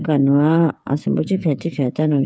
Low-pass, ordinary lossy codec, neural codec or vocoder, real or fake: none; none; codec, 16 kHz, 8 kbps, FreqCodec, smaller model; fake